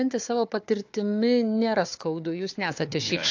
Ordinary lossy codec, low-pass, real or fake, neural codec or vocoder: AAC, 48 kbps; 7.2 kHz; fake; codec, 16 kHz, 4 kbps, FunCodec, trained on Chinese and English, 50 frames a second